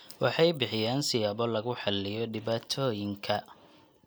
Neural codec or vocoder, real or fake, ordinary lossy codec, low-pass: none; real; none; none